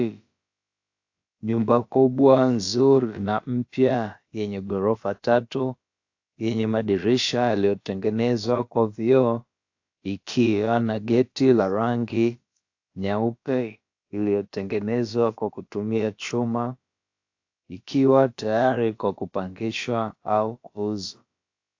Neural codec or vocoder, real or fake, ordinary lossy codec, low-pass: codec, 16 kHz, about 1 kbps, DyCAST, with the encoder's durations; fake; AAC, 48 kbps; 7.2 kHz